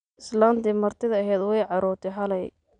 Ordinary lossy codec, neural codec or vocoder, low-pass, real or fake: none; none; 9.9 kHz; real